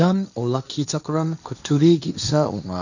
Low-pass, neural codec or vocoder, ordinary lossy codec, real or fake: 7.2 kHz; codec, 16 kHz, 1.1 kbps, Voila-Tokenizer; none; fake